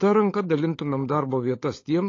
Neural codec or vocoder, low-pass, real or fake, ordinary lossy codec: codec, 16 kHz, 16 kbps, FunCodec, trained on LibriTTS, 50 frames a second; 7.2 kHz; fake; AAC, 32 kbps